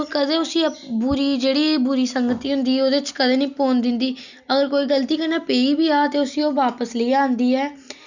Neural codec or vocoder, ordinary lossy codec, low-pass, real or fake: none; none; 7.2 kHz; real